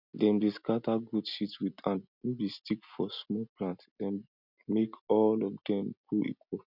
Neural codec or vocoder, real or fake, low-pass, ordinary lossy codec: none; real; 5.4 kHz; none